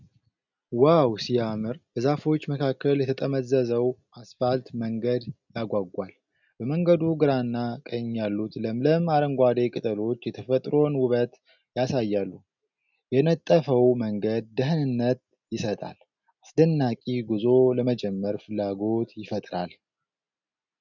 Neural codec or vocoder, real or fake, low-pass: none; real; 7.2 kHz